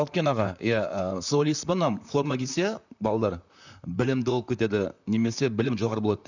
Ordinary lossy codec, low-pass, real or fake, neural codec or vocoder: none; 7.2 kHz; fake; vocoder, 44.1 kHz, 128 mel bands, Pupu-Vocoder